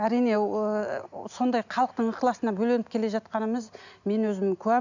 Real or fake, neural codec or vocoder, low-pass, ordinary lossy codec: real; none; 7.2 kHz; none